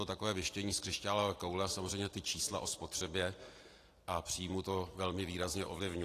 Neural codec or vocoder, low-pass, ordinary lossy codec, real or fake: none; 14.4 kHz; AAC, 48 kbps; real